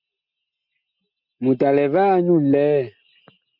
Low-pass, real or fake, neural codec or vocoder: 5.4 kHz; real; none